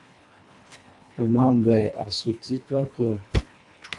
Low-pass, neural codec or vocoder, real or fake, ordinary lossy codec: 10.8 kHz; codec, 24 kHz, 1.5 kbps, HILCodec; fake; AAC, 64 kbps